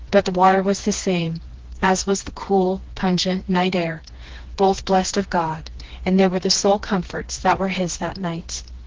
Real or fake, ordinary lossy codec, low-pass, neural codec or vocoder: fake; Opus, 16 kbps; 7.2 kHz; codec, 16 kHz, 2 kbps, FreqCodec, smaller model